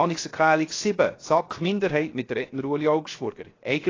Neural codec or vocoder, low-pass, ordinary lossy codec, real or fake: codec, 16 kHz, 0.7 kbps, FocalCodec; 7.2 kHz; AAC, 32 kbps; fake